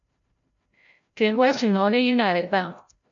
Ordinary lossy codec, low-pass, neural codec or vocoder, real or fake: MP3, 48 kbps; 7.2 kHz; codec, 16 kHz, 0.5 kbps, FreqCodec, larger model; fake